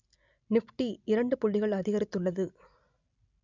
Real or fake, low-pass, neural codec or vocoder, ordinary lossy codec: real; 7.2 kHz; none; none